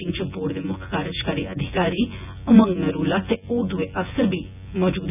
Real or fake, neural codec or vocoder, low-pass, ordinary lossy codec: fake; vocoder, 24 kHz, 100 mel bands, Vocos; 3.6 kHz; none